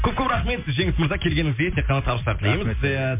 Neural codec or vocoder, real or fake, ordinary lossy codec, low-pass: none; real; MP3, 32 kbps; 3.6 kHz